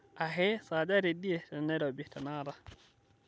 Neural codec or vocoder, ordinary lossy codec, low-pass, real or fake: none; none; none; real